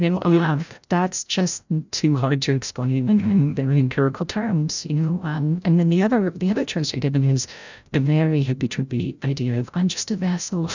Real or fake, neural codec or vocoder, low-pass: fake; codec, 16 kHz, 0.5 kbps, FreqCodec, larger model; 7.2 kHz